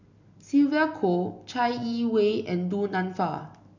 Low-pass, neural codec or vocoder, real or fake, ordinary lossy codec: 7.2 kHz; none; real; none